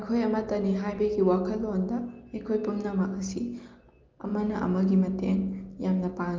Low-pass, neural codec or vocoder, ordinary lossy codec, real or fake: 7.2 kHz; none; Opus, 32 kbps; real